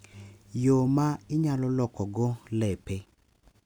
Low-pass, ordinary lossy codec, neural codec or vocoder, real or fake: none; none; none; real